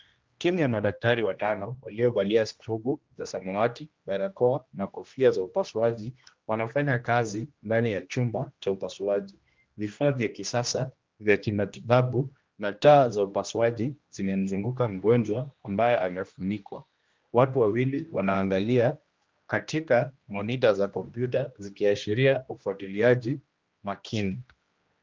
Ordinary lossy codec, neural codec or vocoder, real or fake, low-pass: Opus, 32 kbps; codec, 16 kHz, 1 kbps, X-Codec, HuBERT features, trained on general audio; fake; 7.2 kHz